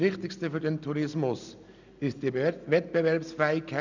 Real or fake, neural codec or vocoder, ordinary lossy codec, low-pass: real; none; none; 7.2 kHz